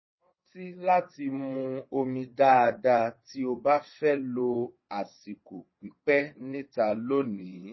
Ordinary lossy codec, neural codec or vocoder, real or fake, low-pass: MP3, 24 kbps; vocoder, 22.05 kHz, 80 mel bands, WaveNeXt; fake; 7.2 kHz